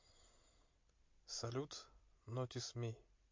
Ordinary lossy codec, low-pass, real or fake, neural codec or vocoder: MP3, 48 kbps; 7.2 kHz; real; none